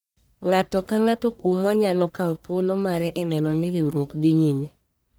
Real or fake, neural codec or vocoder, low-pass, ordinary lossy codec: fake; codec, 44.1 kHz, 1.7 kbps, Pupu-Codec; none; none